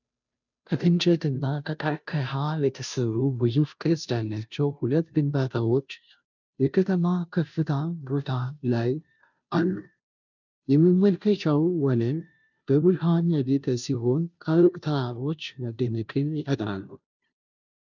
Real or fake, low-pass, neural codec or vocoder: fake; 7.2 kHz; codec, 16 kHz, 0.5 kbps, FunCodec, trained on Chinese and English, 25 frames a second